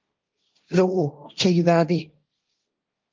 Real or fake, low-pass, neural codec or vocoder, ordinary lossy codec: fake; 7.2 kHz; codec, 16 kHz, 1.1 kbps, Voila-Tokenizer; Opus, 24 kbps